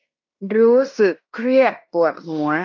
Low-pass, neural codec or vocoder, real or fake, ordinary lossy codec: 7.2 kHz; codec, 16 kHz in and 24 kHz out, 0.9 kbps, LongCat-Audio-Codec, fine tuned four codebook decoder; fake; none